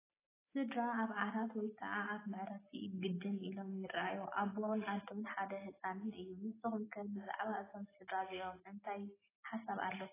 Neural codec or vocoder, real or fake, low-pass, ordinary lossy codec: none; real; 3.6 kHz; AAC, 16 kbps